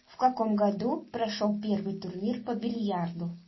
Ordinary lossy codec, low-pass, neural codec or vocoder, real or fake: MP3, 24 kbps; 7.2 kHz; none; real